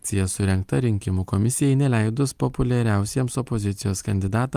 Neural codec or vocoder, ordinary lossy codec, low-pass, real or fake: none; Opus, 32 kbps; 14.4 kHz; real